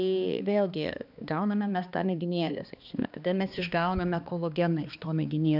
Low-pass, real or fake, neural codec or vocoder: 5.4 kHz; fake; codec, 16 kHz, 2 kbps, X-Codec, HuBERT features, trained on balanced general audio